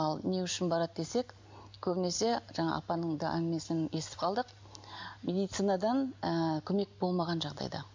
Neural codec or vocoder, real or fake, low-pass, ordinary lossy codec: none; real; 7.2 kHz; MP3, 64 kbps